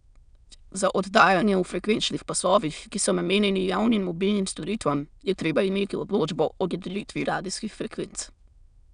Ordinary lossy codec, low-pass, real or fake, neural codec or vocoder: none; 9.9 kHz; fake; autoencoder, 22.05 kHz, a latent of 192 numbers a frame, VITS, trained on many speakers